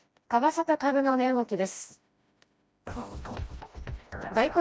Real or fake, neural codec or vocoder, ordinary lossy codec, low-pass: fake; codec, 16 kHz, 1 kbps, FreqCodec, smaller model; none; none